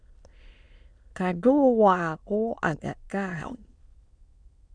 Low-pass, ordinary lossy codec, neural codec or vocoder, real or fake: 9.9 kHz; Opus, 64 kbps; autoencoder, 22.05 kHz, a latent of 192 numbers a frame, VITS, trained on many speakers; fake